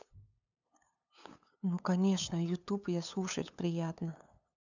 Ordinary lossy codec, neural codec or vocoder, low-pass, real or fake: none; codec, 16 kHz, 8 kbps, FunCodec, trained on LibriTTS, 25 frames a second; 7.2 kHz; fake